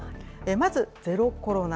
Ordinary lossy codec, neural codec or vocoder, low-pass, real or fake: none; none; none; real